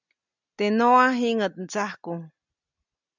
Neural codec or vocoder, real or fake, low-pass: none; real; 7.2 kHz